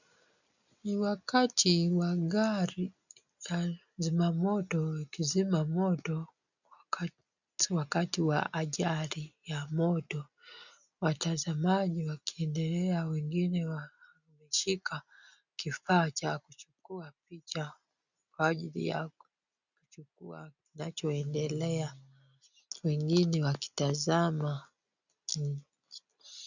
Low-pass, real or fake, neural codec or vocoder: 7.2 kHz; real; none